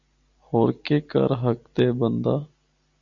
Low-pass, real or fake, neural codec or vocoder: 7.2 kHz; real; none